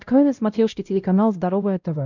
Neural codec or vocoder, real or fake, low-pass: codec, 16 kHz, 0.5 kbps, X-Codec, WavLM features, trained on Multilingual LibriSpeech; fake; 7.2 kHz